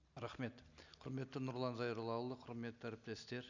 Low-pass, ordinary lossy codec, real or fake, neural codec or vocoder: 7.2 kHz; none; real; none